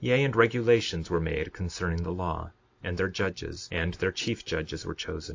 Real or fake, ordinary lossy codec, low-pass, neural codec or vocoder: real; AAC, 48 kbps; 7.2 kHz; none